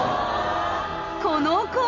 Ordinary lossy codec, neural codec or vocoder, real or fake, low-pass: none; none; real; 7.2 kHz